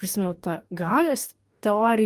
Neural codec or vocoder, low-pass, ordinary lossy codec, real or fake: codec, 44.1 kHz, 2.6 kbps, DAC; 14.4 kHz; Opus, 32 kbps; fake